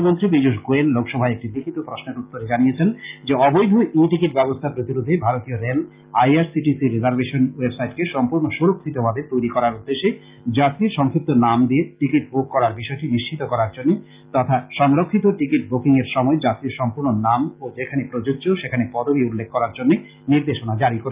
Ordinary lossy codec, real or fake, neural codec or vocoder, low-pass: Opus, 24 kbps; real; none; 3.6 kHz